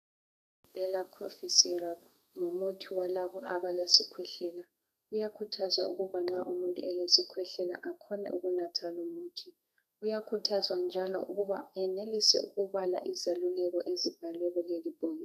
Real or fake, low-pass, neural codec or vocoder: fake; 14.4 kHz; codec, 32 kHz, 1.9 kbps, SNAC